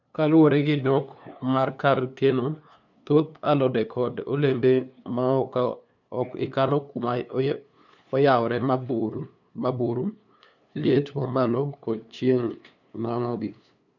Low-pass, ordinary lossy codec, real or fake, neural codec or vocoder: 7.2 kHz; none; fake; codec, 16 kHz, 2 kbps, FunCodec, trained on LibriTTS, 25 frames a second